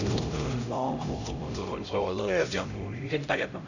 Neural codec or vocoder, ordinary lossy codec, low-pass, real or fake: codec, 16 kHz, 0.5 kbps, X-Codec, HuBERT features, trained on LibriSpeech; none; 7.2 kHz; fake